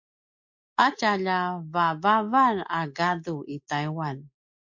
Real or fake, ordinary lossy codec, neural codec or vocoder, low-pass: real; MP3, 48 kbps; none; 7.2 kHz